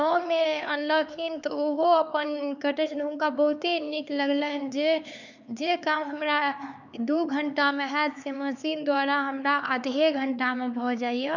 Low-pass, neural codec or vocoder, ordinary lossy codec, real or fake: 7.2 kHz; codec, 16 kHz, 4 kbps, X-Codec, HuBERT features, trained on LibriSpeech; none; fake